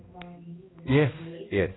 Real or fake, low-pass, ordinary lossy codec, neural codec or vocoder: fake; 7.2 kHz; AAC, 16 kbps; codec, 16 kHz, 1 kbps, X-Codec, HuBERT features, trained on balanced general audio